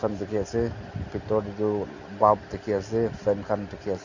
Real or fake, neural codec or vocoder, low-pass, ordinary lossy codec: real; none; 7.2 kHz; none